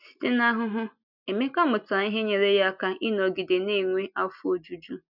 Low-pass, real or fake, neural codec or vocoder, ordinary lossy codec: 5.4 kHz; real; none; none